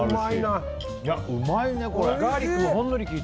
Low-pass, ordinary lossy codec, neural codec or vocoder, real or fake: none; none; none; real